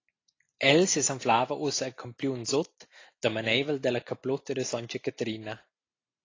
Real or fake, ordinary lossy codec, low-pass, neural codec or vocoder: real; AAC, 32 kbps; 7.2 kHz; none